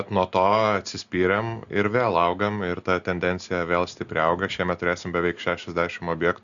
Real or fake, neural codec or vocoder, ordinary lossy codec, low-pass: real; none; Opus, 64 kbps; 7.2 kHz